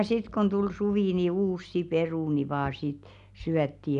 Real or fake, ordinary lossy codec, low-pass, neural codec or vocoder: real; none; 10.8 kHz; none